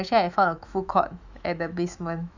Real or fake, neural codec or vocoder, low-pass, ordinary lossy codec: fake; autoencoder, 48 kHz, 128 numbers a frame, DAC-VAE, trained on Japanese speech; 7.2 kHz; none